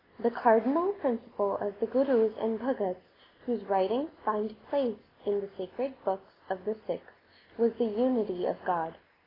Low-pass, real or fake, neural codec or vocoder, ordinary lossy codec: 5.4 kHz; real; none; AAC, 24 kbps